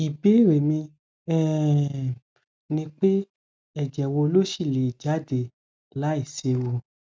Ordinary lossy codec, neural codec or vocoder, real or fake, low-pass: none; none; real; none